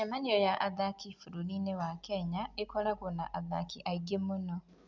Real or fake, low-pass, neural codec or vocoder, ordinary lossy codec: real; 7.2 kHz; none; none